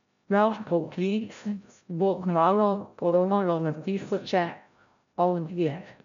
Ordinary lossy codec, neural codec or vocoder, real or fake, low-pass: MP3, 64 kbps; codec, 16 kHz, 0.5 kbps, FreqCodec, larger model; fake; 7.2 kHz